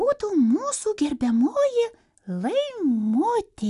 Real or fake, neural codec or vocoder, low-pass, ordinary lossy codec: real; none; 10.8 kHz; AAC, 96 kbps